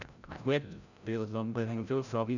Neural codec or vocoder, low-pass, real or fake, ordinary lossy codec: codec, 16 kHz, 0.5 kbps, FreqCodec, larger model; 7.2 kHz; fake; none